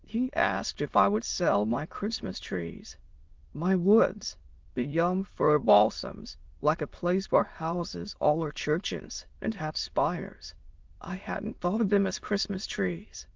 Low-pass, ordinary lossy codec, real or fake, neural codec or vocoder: 7.2 kHz; Opus, 16 kbps; fake; autoencoder, 22.05 kHz, a latent of 192 numbers a frame, VITS, trained on many speakers